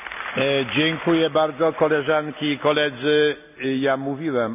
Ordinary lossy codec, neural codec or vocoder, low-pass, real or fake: none; none; 3.6 kHz; real